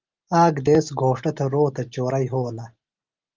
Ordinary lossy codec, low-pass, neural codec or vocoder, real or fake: Opus, 32 kbps; 7.2 kHz; none; real